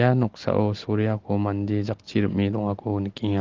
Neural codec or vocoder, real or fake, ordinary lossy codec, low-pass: none; real; Opus, 16 kbps; 7.2 kHz